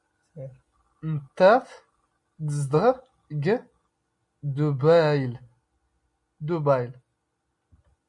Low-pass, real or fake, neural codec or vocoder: 10.8 kHz; real; none